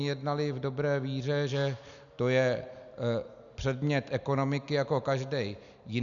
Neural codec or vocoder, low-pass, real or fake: none; 7.2 kHz; real